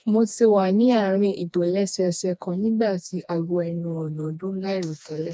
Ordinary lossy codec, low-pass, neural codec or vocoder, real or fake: none; none; codec, 16 kHz, 2 kbps, FreqCodec, smaller model; fake